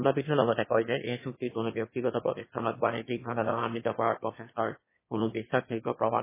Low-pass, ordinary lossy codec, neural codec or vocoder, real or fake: 3.6 kHz; MP3, 16 kbps; autoencoder, 22.05 kHz, a latent of 192 numbers a frame, VITS, trained on one speaker; fake